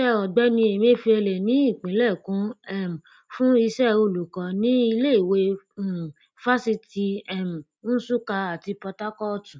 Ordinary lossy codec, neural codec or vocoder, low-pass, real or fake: none; none; none; real